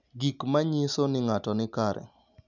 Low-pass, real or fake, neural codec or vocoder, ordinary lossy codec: 7.2 kHz; real; none; none